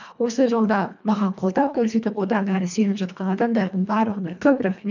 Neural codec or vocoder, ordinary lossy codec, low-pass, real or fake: codec, 24 kHz, 1.5 kbps, HILCodec; none; 7.2 kHz; fake